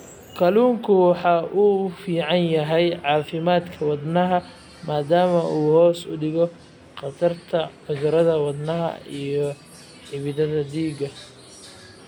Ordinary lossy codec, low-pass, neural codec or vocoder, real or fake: none; 19.8 kHz; none; real